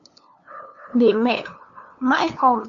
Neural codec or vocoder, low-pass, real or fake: codec, 16 kHz, 2 kbps, FunCodec, trained on LibriTTS, 25 frames a second; 7.2 kHz; fake